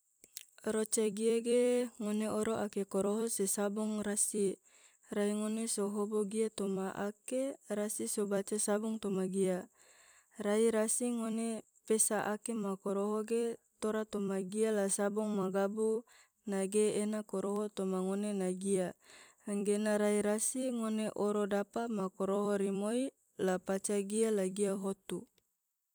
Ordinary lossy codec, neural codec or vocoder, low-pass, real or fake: none; vocoder, 44.1 kHz, 128 mel bands every 256 samples, BigVGAN v2; none; fake